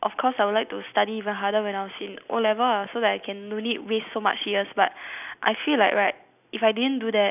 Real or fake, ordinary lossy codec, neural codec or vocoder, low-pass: real; none; none; 3.6 kHz